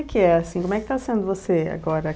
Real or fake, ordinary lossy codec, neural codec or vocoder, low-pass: real; none; none; none